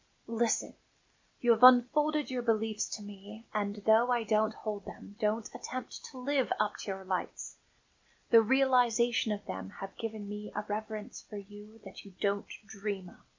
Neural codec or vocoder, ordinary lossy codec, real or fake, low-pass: none; MP3, 48 kbps; real; 7.2 kHz